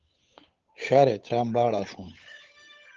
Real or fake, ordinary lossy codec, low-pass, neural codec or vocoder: fake; Opus, 32 kbps; 7.2 kHz; codec, 16 kHz, 8 kbps, FunCodec, trained on Chinese and English, 25 frames a second